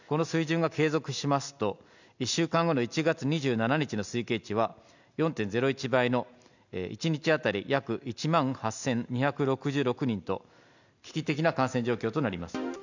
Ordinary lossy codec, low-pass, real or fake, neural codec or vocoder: none; 7.2 kHz; real; none